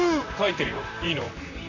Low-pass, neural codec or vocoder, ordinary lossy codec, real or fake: 7.2 kHz; vocoder, 44.1 kHz, 128 mel bands, Pupu-Vocoder; none; fake